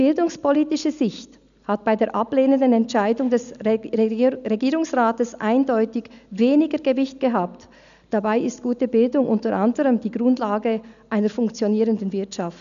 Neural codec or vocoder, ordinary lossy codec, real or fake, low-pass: none; none; real; 7.2 kHz